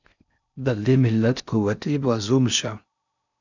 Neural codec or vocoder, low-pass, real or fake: codec, 16 kHz in and 24 kHz out, 0.6 kbps, FocalCodec, streaming, 4096 codes; 7.2 kHz; fake